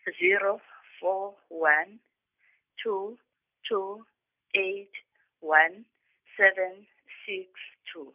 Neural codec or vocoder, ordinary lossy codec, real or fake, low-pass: none; none; real; 3.6 kHz